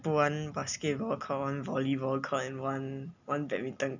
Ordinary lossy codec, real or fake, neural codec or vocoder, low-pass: none; real; none; 7.2 kHz